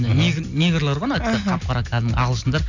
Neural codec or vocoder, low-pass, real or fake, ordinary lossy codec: none; 7.2 kHz; real; none